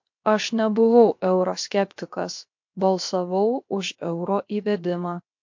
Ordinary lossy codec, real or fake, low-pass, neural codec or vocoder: MP3, 48 kbps; fake; 7.2 kHz; codec, 16 kHz, 0.7 kbps, FocalCodec